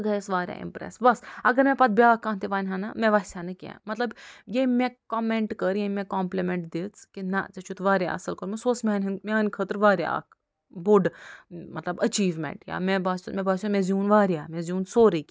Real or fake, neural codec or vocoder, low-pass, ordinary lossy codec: real; none; none; none